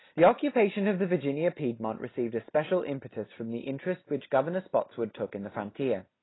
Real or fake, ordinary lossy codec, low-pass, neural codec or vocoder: real; AAC, 16 kbps; 7.2 kHz; none